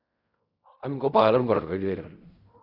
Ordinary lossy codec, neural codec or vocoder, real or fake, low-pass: Opus, 64 kbps; codec, 16 kHz in and 24 kHz out, 0.4 kbps, LongCat-Audio-Codec, fine tuned four codebook decoder; fake; 5.4 kHz